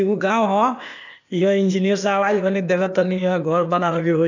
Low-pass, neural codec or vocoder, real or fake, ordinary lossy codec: 7.2 kHz; codec, 16 kHz, 0.8 kbps, ZipCodec; fake; none